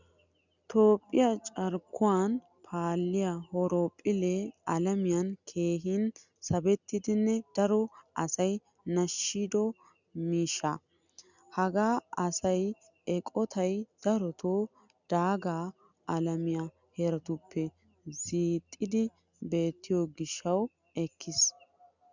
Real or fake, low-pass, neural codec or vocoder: real; 7.2 kHz; none